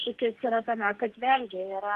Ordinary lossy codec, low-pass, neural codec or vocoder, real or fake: Opus, 16 kbps; 14.4 kHz; codec, 44.1 kHz, 2.6 kbps, SNAC; fake